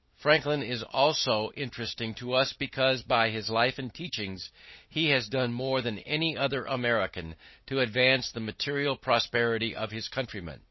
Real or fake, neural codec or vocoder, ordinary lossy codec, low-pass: real; none; MP3, 24 kbps; 7.2 kHz